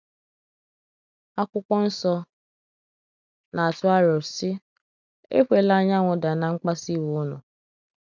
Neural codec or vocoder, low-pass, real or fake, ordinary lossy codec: none; 7.2 kHz; real; none